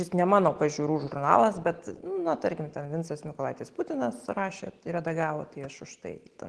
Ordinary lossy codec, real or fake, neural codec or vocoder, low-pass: Opus, 16 kbps; real; none; 10.8 kHz